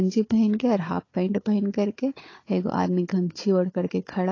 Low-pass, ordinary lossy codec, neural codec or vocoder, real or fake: 7.2 kHz; AAC, 32 kbps; codec, 16 kHz, 4 kbps, FunCodec, trained on Chinese and English, 50 frames a second; fake